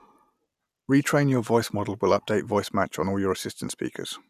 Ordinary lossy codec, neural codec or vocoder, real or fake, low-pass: none; none; real; 14.4 kHz